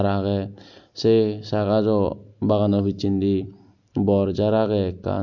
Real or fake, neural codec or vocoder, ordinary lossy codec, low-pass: real; none; none; 7.2 kHz